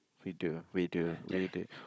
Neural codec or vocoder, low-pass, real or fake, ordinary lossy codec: codec, 16 kHz, 16 kbps, FunCodec, trained on Chinese and English, 50 frames a second; none; fake; none